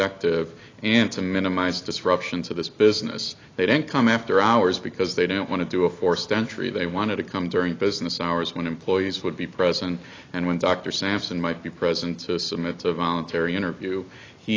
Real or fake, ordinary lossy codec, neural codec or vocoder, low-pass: real; AAC, 32 kbps; none; 7.2 kHz